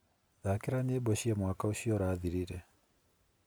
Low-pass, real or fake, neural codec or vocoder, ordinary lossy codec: none; real; none; none